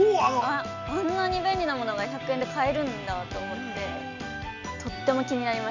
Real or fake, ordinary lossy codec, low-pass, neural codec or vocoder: real; none; 7.2 kHz; none